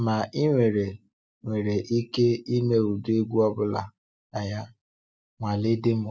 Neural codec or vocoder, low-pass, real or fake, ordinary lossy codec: none; none; real; none